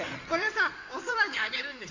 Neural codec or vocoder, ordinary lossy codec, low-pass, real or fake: codec, 16 kHz in and 24 kHz out, 2.2 kbps, FireRedTTS-2 codec; none; 7.2 kHz; fake